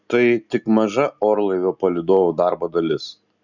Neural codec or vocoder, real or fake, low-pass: none; real; 7.2 kHz